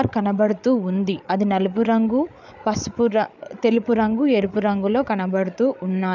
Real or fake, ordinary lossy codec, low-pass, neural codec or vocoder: fake; none; 7.2 kHz; codec, 16 kHz, 8 kbps, FreqCodec, larger model